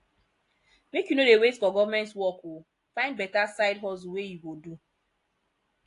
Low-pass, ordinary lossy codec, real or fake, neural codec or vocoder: 10.8 kHz; AAC, 48 kbps; real; none